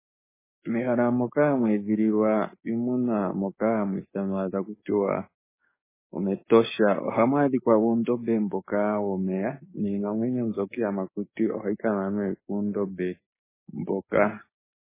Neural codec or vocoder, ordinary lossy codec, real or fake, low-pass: codec, 16 kHz, 6 kbps, DAC; MP3, 16 kbps; fake; 3.6 kHz